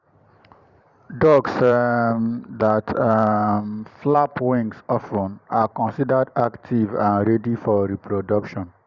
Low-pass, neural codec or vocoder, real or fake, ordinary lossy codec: 7.2 kHz; vocoder, 44.1 kHz, 128 mel bands every 512 samples, BigVGAN v2; fake; Opus, 64 kbps